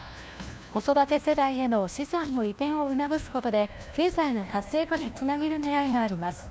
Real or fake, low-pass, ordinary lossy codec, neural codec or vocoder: fake; none; none; codec, 16 kHz, 1 kbps, FunCodec, trained on LibriTTS, 50 frames a second